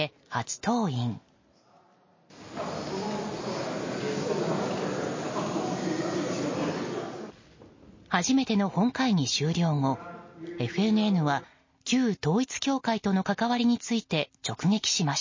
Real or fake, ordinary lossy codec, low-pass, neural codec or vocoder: real; MP3, 32 kbps; 7.2 kHz; none